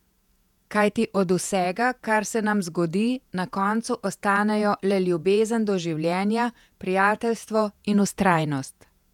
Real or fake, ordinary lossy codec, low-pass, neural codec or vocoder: fake; none; 19.8 kHz; vocoder, 48 kHz, 128 mel bands, Vocos